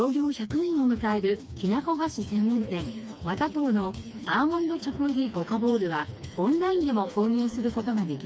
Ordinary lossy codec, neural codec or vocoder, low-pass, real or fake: none; codec, 16 kHz, 2 kbps, FreqCodec, smaller model; none; fake